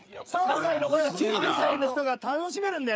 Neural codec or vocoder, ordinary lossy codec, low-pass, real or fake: codec, 16 kHz, 4 kbps, FreqCodec, larger model; none; none; fake